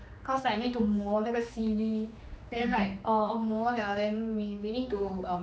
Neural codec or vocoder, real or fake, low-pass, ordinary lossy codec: codec, 16 kHz, 2 kbps, X-Codec, HuBERT features, trained on general audio; fake; none; none